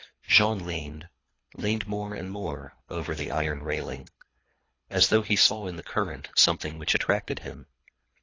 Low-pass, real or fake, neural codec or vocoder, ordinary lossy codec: 7.2 kHz; fake; codec, 24 kHz, 3 kbps, HILCodec; AAC, 32 kbps